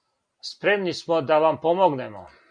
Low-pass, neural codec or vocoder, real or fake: 9.9 kHz; none; real